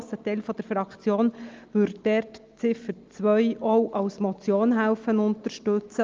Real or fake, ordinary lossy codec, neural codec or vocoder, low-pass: real; Opus, 24 kbps; none; 7.2 kHz